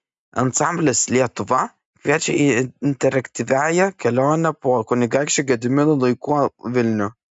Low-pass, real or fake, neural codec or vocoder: 10.8 kHz; real; none